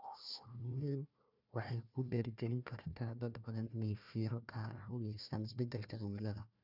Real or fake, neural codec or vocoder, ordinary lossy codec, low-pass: fake; codec, 16 kHz, 1 kbps, FunCodec, trained on Chinese and English, 50 frames a second; none; 5.4 kHz